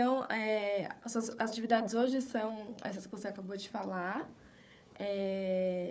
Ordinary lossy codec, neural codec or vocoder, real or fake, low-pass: none; codec, 16 kHz, 4 kbps, FunCodec, trained on Chinese and English, 50 frames a second; fake; none